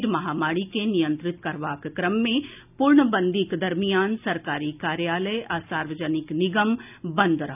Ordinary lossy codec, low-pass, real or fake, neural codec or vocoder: none; 3.6 kHz; real; none